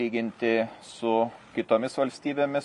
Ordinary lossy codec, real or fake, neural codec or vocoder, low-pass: MP3, 48 kbps; real; none; 14.4 kHz